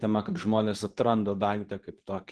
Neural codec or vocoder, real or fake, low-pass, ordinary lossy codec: codec, 24 kHz, 0.9 kbps, WavTokenizer, medium speech release version 2; fake; 10.8 kHz; Opus, 16 kbps